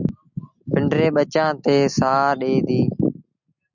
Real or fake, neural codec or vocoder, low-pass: real; none; 7.2 kHz